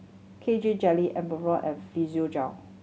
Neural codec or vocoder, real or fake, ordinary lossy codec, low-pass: none; real; none; none